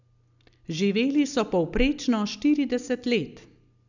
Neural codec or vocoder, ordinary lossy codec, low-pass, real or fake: none; none; 7.2 kHz; real